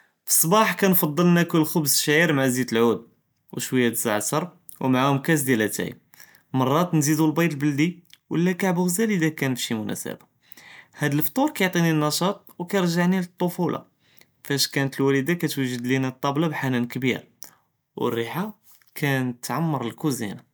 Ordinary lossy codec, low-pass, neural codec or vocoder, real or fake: none; none; none; real